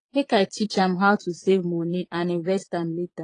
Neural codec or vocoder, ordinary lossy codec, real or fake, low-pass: vocoder, 22.05 kHz, 80 mel bands, Vocos; AAC, 32 kbps; fake; 9.9 kHz